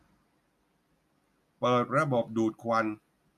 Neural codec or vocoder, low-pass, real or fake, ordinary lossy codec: none; 14.4 kHz; real; none